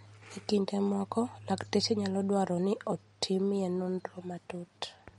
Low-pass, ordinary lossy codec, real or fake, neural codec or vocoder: 19.8 kHz; MP3, 48 kbps; real; none